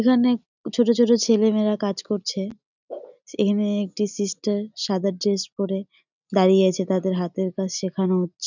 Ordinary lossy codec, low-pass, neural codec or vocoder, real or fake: none; 7.2 kHz; none; real